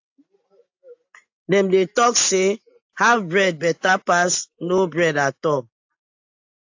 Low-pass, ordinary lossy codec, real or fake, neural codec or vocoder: 7.2 kHz; AAC, 48 kbps; real; none